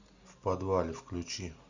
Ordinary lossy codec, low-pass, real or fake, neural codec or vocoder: Opus, 64 kbps; 7.2 kHz; real; none